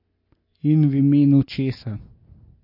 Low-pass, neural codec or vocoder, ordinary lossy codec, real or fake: 5.4 kHz; none; MP3, 32 kbps; real